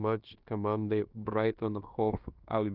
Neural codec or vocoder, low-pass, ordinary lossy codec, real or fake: codec, 16 kHz in and 24 kHz out, 0.9 kbps, LongCat-Audio-Codec, fine tuned four codebook decoder; 5.4 kHz; Opus, 32 kbps; fake